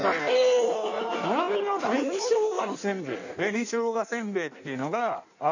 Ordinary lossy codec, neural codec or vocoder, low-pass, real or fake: none; codec, 16 kHz in and 24 kHz out, 1.1 kbps, FireRedTTS-2 codec; 7.2 kHz; fake